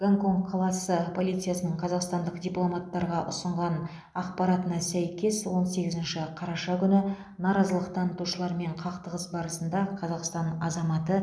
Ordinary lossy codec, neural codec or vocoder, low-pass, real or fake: none; none; none; real